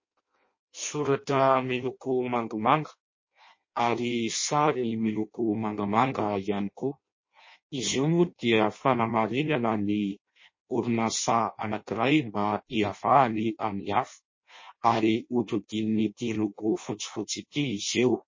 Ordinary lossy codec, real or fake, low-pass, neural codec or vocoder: MP3, 32 kbps; fake; 7.2 kHz; codec, 16 kHz in and 24 kHz out, 0.6 kbps, FireRedTTS-2 codec